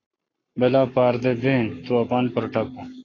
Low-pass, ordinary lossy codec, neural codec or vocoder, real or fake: 7.2 kHz; AAC, 32 kbps; codec, 44.1 kHz, 7.8 kbps, Pupu-Codec; fake